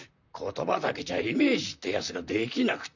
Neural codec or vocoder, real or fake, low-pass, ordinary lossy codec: vocoder, 44.1 kHz, 128 mel bands, Pupu-Vocoder; fake; 7.2 kHz; none